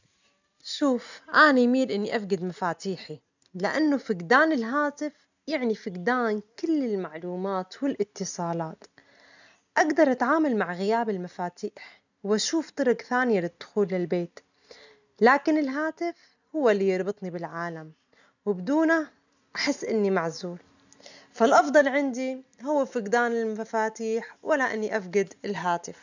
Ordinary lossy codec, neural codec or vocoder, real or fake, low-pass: none; none; real; 7.2 kHz